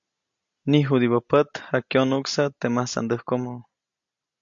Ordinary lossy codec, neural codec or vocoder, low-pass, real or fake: AAC, 64 kbps; none; 7.2 kHz; real